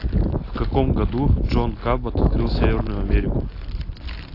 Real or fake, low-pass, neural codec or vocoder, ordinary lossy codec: real; 5.4 kHz; none; AAC, 32 kbps